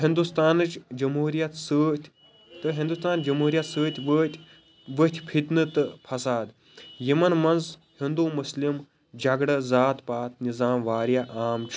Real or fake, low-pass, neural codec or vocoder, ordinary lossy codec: real; none; none; none